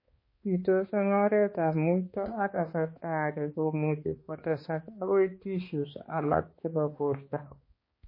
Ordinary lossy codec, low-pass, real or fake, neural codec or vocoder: MP3, 24 kbps; 5.4 kHz; fake; codec, 16 kHz, 2 kbps, X-Codec, HuBERT features, trained on balanced general audio